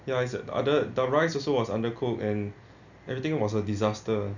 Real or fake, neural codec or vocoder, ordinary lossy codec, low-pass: real; none; none; 7.2 kHz